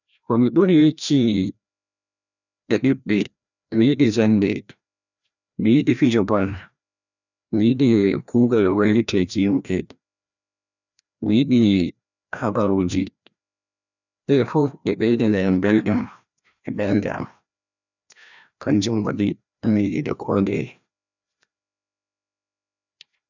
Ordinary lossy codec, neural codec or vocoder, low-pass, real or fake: none; codec, 16 kHz, 1 kbps, FreqCodec, larger model; 7.2 kHz; fake